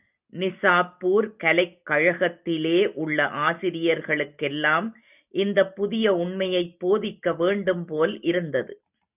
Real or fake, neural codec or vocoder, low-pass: real; none; 3.6 kHz